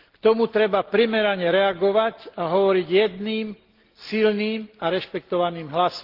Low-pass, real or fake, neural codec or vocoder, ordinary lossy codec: 5.4 kHz; real; none; Opus, 16 kbps